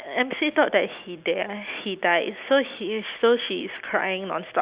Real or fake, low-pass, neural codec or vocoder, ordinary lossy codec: real; 3.6 kHz; none; Opus, 64 kbps